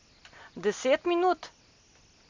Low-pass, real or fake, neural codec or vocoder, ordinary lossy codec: 7.2 kHz; real; none; MP3, 64 kbps